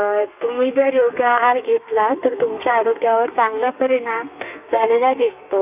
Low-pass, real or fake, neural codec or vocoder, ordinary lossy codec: 3.6 kHz; fake; codec, 32 kHz, 1.9 kbps, SNAC; none